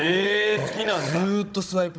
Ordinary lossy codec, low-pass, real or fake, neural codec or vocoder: none; none; fake; codec, 16 kHz, 16 kbps, FunCodec, trained on Chinese and English, 50 frames a second